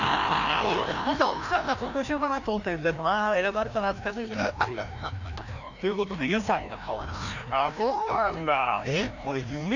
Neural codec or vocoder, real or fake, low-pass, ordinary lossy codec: codec, 16 kHz, 1 kbps, FreqCodec, larger model; fake; 7.2 kHz; none